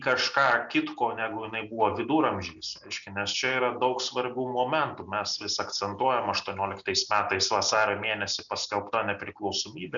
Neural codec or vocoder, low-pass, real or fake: none; 7.2 kHz; real